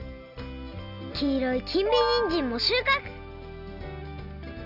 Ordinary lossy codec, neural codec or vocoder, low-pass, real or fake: none; none; 5.4 kHz; real